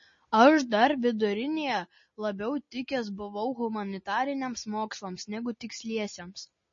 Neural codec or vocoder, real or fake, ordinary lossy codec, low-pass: none; real; MP3, 32 kbps; 7.2 kHz